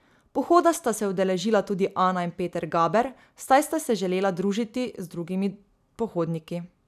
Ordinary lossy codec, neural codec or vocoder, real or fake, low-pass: none; none; real; 14.4 kHz